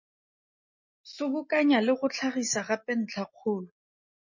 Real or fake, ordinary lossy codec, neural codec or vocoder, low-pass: real; MP3, 32 kbps; none; 7.2 kHz